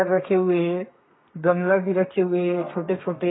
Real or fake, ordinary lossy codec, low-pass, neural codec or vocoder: fake; AAC, 16 kbps; 7.2 kHz; codec, 32 kHz, 1.9 kbps, SNAC